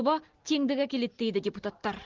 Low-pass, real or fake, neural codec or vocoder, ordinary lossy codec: 7.2 kHz; real; none; Opus, 16 kbps